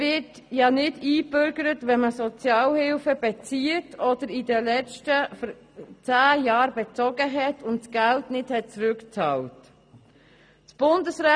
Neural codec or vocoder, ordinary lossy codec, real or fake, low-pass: none; none; real; 9.9 kHz